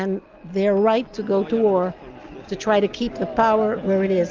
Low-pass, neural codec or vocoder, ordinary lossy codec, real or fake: 7.2 kHz; none; Opus, 24 kbps; real